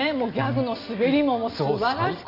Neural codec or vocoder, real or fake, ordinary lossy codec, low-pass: none; real; none; 5.4 kHz